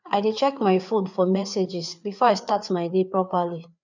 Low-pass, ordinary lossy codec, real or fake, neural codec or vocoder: 7.2 kHz; none; fake; codec, 16 kHz, 4 kbps, FreqCodec, larger model